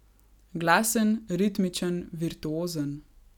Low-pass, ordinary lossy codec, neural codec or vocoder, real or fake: 19.8 kHz; none; none; real